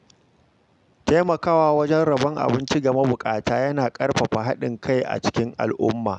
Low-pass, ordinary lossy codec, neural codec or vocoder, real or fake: 10.8 kHz; none; none; real